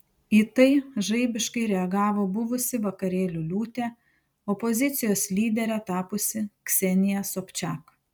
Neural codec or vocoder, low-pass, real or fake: none; 19.8 kHz; real